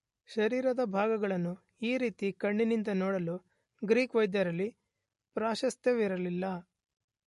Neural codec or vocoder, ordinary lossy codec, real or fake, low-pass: none; MP3, 48 kbps; real; 14.4 kHz